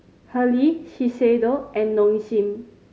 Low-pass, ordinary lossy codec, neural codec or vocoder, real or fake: none; none; none; real